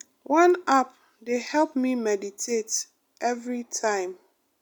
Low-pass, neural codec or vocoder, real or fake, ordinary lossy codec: none; none; real; none